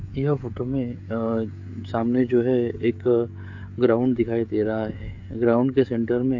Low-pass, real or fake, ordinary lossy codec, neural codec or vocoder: 7.2 kHz; fake; AAC, 48 kbps; codec, 16 kHz, 16 kbps, FreqCodec, smaller model